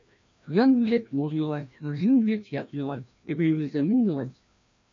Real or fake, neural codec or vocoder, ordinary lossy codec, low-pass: fake; codec, 16 kHz, 1 kbps, FreqCodec, larger model; MP3, 48 kbps; 7.2 kHz